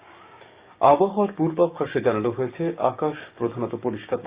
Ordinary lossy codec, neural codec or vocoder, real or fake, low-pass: Opus, 64 kbps; codec, 16 kHz, 6 kbps, DAC; fake; 3.6 kHz